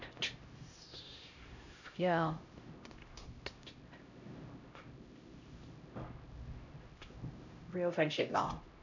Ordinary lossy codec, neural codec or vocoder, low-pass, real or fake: none; codec, 16 kHz, 0.5 kbps, X-Codec, HuBERT features, trained on LibriSpeech; 7.2 kHz; fake